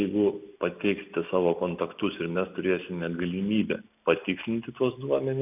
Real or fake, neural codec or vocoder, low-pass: real; none; 3.6 kHz